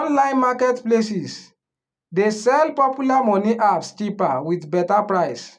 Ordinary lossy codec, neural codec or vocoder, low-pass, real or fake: none; none; 9.9 kHz; real